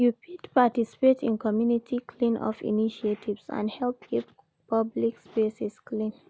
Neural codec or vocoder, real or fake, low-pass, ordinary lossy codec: none; real; none; none